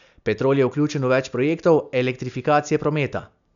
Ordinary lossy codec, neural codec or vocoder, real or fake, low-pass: none; none; real; 7.2 kHz